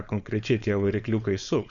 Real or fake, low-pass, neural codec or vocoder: fake; 7.2 kHz; codec, 16 kHz, 4.8 kbps, FACodec